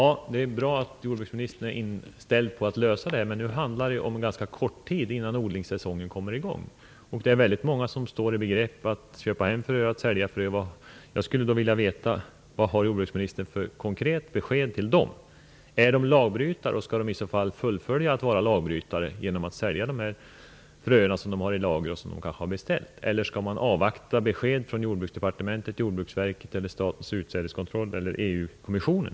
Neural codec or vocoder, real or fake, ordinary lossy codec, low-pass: none; real; none; none